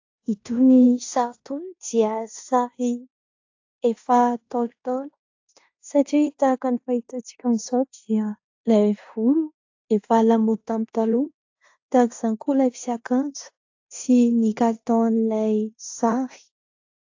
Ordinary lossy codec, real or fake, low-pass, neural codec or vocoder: AAC, 48 kbps; fake; 7.2 kHz; codec, 16 kHz in and 24 kHz out, 0.9 kbps, LongCat-Audio-Codec, fine tuned four codebook decoder